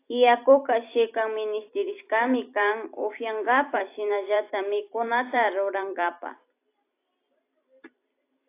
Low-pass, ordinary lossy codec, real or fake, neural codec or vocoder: 3.6 kHz; AAC, 24 kbps; fake; vocoder, 44.1 kHz, 128 mel bands every 256 samples, BigVGAN v2